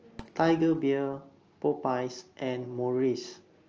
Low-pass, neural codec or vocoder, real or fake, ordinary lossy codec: 7.2 kHz; none; real; Opus, 24 kbps